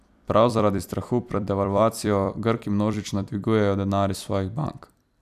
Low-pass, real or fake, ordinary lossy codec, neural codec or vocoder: 14.4 kHz; fake; none; vocoder, 44.1 kHz, 128 mel bands every 256 samples, BigVGAN v2